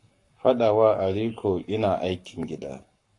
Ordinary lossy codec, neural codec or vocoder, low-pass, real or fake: AAC, 48 kbps; codec, 44.1 kHz, 7.8 kbps, Pupu-Codec; 10.8 kHz; fake